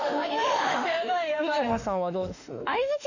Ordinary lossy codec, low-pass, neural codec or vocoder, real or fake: none; 7.2 kHz; autoencoder, 48 kHz, 32 numbers a frame, DAC-VAE, trained on Japanese speech; fake